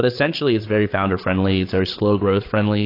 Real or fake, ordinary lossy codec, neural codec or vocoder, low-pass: fake; AAC, 32 kbps; codec, 16 kHz, 8 kbps, FreqCodec, larger model; 5.4 kHz